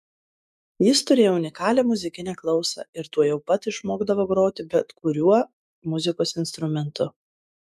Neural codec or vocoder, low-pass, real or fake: autoencoder, 48 kHz, 128 numbers a frame, DAC-VAE, trained on Japanese speech; 14.4 kHz; fake